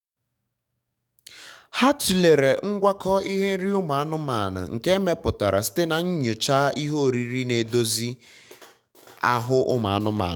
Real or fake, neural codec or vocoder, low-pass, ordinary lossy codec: fake; codec, 44.1 kHz, 7.8 kbps, DAC; 19.8 kHz; none